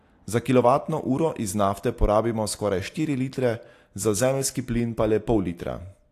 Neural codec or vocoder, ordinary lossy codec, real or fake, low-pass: none; AAC, 64 kbps; real; 14.4 kHz